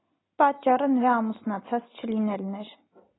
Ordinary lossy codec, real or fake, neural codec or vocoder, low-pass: AAC, 16 kbps; real; none; 7.2 kHz